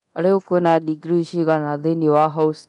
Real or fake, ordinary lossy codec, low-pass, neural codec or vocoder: fake; none; 10.8 kHz; codec, 24 kHz, 0.9 kbps, DualCodec